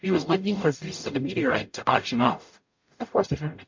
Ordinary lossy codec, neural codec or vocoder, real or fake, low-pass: MP3, 48 kbps; codec, 44.1 kHz, 0.9 kbps, DAC; fake; 7.2 kHz